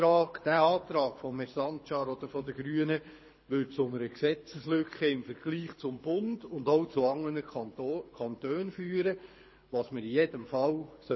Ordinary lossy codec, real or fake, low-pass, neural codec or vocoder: MP3, 24 kbps; fake; 7.2 kHz; codec, 24 kHz, 6 kbps, HILCodec